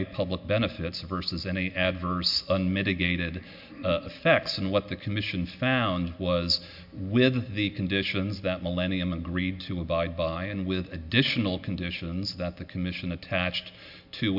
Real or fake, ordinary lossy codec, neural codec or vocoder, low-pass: real; MP3, 48 kbps; none; 5.4 kHz